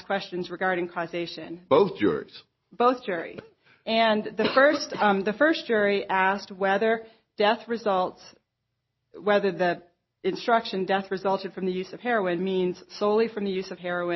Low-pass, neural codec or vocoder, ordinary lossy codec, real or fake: 7.2 kHz; none; MP3, 24 kbps; real